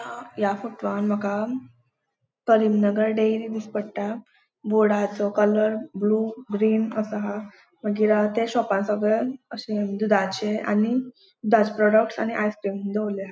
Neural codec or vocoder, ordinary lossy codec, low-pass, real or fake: none; none; none; real